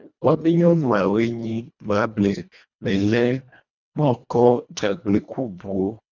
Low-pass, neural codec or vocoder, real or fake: 7.2 kHz; codec, 24 kHz, 1.5 kbps, HILCodec; fake